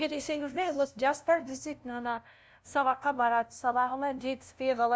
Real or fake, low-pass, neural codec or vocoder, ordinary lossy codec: fake; none; codec, 16 kHz, 0.5 kbps, FunCodec, trained on LibriTTS, 25 frames a second; none